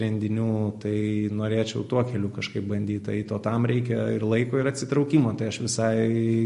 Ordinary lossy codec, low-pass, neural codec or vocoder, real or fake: MP3, 48 kbps; 14.4 kHz; none; real